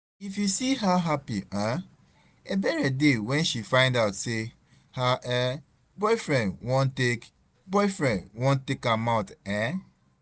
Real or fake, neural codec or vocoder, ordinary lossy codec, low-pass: real; none; none; none